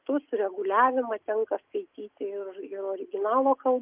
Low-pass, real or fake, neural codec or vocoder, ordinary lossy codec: 3.6 kHz; real; none; Opus, 24 kbps